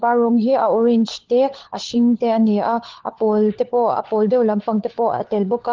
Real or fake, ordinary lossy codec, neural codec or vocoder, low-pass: fake; Opus, 16 kbps; codec, 16 kHz, 4 kbps, FunCodec, trained on LibriTTS, 50 frames a second; 7.2 kHz